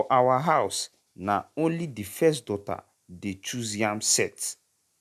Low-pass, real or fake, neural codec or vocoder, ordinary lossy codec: 14.4 kHz; real; none; none